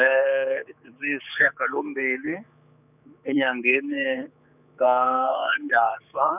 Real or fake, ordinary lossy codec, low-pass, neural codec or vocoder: fake; none; 3.6 kHz; codec, 16 kHz, 4 kbps, X-Codec, HuBERT features, trained on general audio